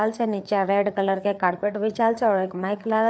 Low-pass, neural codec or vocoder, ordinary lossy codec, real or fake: none; codec, 16 kHz, 4 kbps, FreqCodec, larger model; none; fake